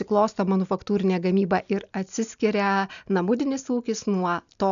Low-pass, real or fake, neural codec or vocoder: 7.2 kHz; real; none